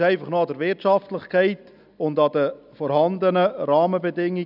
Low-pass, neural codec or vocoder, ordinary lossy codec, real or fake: 5.4 kHz; none; none; real